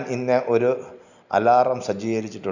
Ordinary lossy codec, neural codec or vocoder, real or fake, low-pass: none; none; real; 7.2 kHz